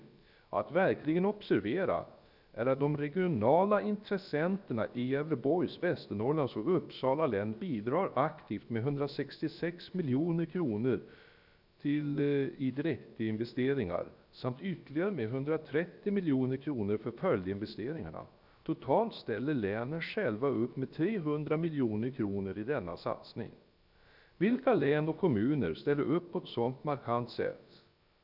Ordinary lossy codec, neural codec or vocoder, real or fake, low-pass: none; codec, 16 kHz, about 1 kbps, DyCAST, with the encoder's durations; fake; 5.4 kHz